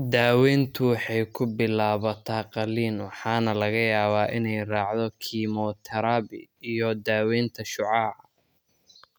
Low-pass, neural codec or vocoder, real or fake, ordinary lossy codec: none; none; real; none